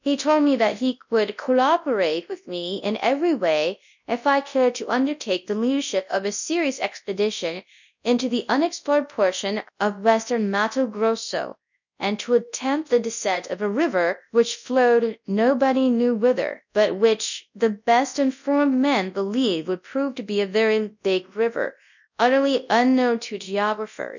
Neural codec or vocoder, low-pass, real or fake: codec, 24 kHz, 0.9 kbps, WavTokenizer, large speech release; 7.2 kHz; fake